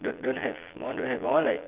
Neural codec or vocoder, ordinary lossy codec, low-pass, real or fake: vocoder, 22.05 kHz, 80 mel bands, Vocos; Opus, 24 kbps; 3.6 kHz; fake